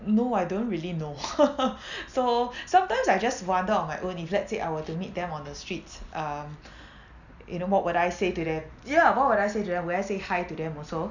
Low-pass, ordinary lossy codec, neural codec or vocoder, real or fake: 7.2 kHz; none; none; real